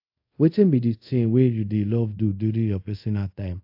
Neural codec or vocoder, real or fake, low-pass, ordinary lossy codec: codec, 24 kHz, 0.5 kbps, DualCodec; fake; 5.4 kHz; AAC, 48 kbps